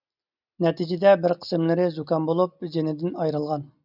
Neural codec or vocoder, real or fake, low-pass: none; real; 5.4 kHz